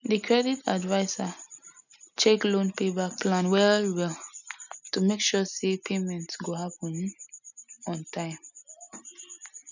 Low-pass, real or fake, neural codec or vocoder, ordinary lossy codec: 7.2 kHz; real; none; none